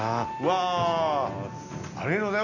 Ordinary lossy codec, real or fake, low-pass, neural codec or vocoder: none; real; 7.2 kHz; none